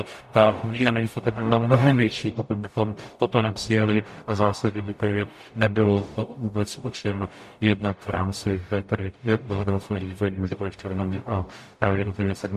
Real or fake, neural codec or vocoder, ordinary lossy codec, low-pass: fake; codec, 44.1 kHz, 0.9 kbps, DAC; MP3, 64 kbps; 14.4 kHz